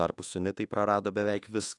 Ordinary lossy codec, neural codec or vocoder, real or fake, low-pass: AAC, 48 kbps; codec, 24 kHz, 1.2 kbps, DualCodec; fake; 10.8 kHz